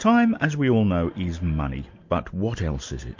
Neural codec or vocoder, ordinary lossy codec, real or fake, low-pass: none; MP3, 48 kbps; real; 7.2 kHz